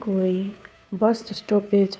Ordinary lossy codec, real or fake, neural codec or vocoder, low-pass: none; fake; codec, 16 kHz, 2 kbps, FunCodec, trained on Chinese and English, 25 frames a second; none